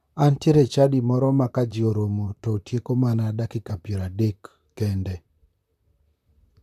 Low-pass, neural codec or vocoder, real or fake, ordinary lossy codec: 14.4 kHz; vocoder, 44.1 kHz, 128 mel bands, Pupu-Vocoder; fake; none